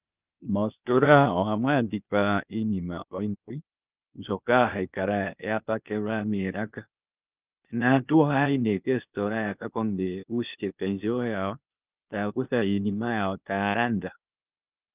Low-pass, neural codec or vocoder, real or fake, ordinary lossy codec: 3.6 kHz; codec, 16 kHz, 0.8 kbps, ZipCodec; fake; Opus, 24 kbps